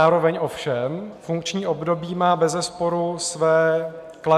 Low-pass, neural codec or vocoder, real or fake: 14.4 kHz; none; real